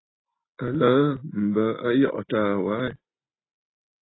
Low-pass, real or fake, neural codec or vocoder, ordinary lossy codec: 7.2 kHz; real; none; AAC, 16 kbps